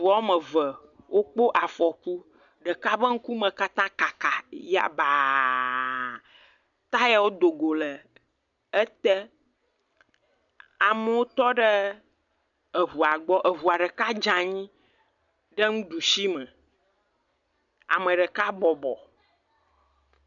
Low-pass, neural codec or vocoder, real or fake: 7.2 kHz; none; real